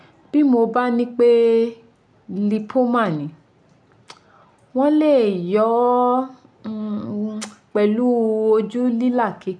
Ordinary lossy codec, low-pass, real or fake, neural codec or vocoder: none; 9.9 kHz; real; none